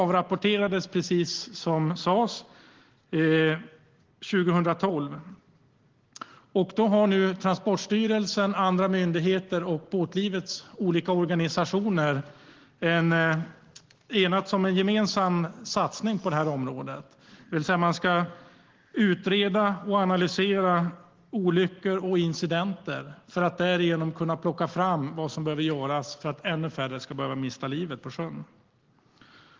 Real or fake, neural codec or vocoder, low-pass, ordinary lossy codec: real; none; 7.2 kHz; Opus, 16 kbps